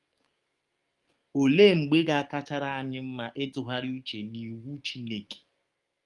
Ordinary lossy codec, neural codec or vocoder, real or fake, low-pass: Opus, 32 kbps; codec, 44.1 kHz, 7.8 kbps, DAC; fake; 10.8 kHz